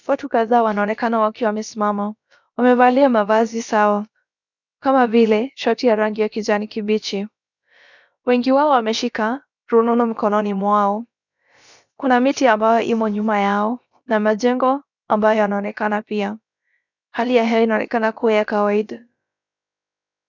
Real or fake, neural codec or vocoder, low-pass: fake; codec, 16 kHz, about 1 kbps, DyCAST, with the encoder's durations; 7.2 kHz